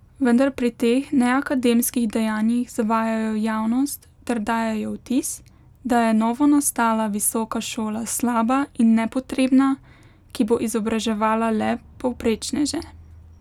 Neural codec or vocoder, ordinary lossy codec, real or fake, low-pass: none; none; real; 19.8 kHz